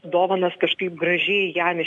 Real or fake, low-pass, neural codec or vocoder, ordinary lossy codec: real; 10.8 kHz; none; AAC, 64 kbps